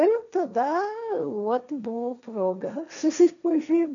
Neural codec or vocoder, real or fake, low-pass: codec, 16 kHz, 1.1 kbps, Voila-Tokenizer; fake; 7.2 kHz